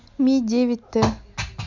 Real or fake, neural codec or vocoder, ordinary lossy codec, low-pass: real; none; none; 7.2 kHz